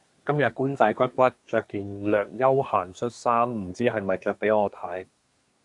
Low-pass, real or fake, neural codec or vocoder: 10.8 kHz; fake; codec, 24 kHz, 1 kbps, SNAC